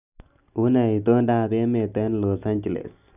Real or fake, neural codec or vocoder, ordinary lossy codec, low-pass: real; none; none; 3.6 kHz